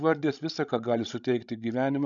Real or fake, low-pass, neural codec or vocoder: fake; 7.2 kHz; codec, 16 kHz, 16 kbps, FreqCodec, larger model